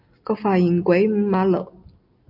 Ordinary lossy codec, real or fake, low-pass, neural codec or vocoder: AAC, 48 kbps; real; 5.4 kHz; none